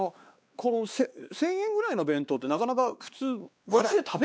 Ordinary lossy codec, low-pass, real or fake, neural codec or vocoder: none; none; fake; codec, 16 kHz, 2 kbps, X-Codec, WavLM features, trained on Multilingual LibriSpeech